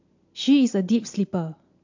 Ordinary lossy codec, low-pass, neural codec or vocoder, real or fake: AAC, 48 kbps; 7.2 kHz; none; real